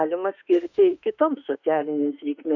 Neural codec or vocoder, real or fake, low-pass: autoencoder, 48 kHz, 32 numbers a frame, DAC-VAE, trained on Japanese speech; fake; 7.2 kHz